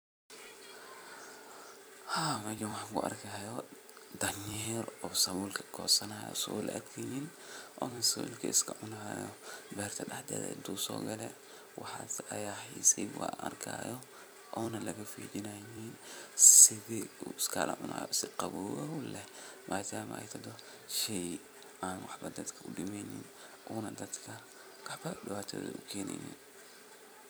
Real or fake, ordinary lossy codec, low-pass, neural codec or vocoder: fake; none; none; vocoder, 44.1 kHz, 128 mel bands every 256 samples, BigVGAN v2